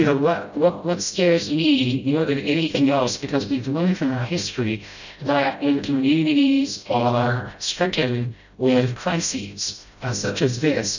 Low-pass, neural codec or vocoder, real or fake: 7.2 kHz; codec, 16 kHz, 0.5 kbps, FreqCodec, smaller model; fake